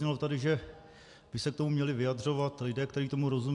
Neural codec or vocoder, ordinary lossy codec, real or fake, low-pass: none; AAC, 64 kbps; real; 10.8 kHz